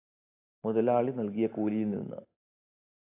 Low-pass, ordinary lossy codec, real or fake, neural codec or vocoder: 3.6 kHz; AAC, 24 kbps; real; none